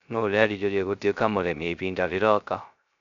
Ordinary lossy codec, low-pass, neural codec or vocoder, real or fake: MP3, 64 kbps; 7.2 kHz; codec, 16 kHz, 0.3 kbps, FocalCodec; fake